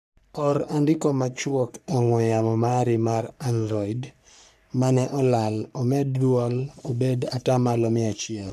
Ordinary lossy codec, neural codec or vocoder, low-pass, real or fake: none; codec, 44.1 kHz, 3.4 kbps, Pupu-Codec; 14.4 kHz; fake